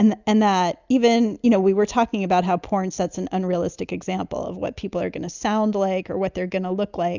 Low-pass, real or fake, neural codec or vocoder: 7.2 kHz; real; none